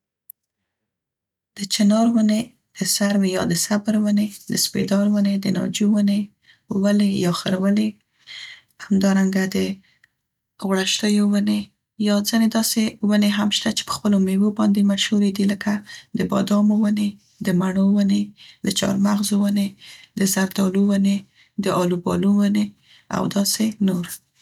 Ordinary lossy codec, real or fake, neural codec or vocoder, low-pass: none; real; none; 19.8 kHz